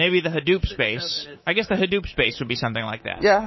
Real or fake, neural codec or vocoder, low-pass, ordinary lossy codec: fake; codec, 24 kHz, 3.1 kbps, DualCodec; 7.2 kHz; MP3, 24 kbps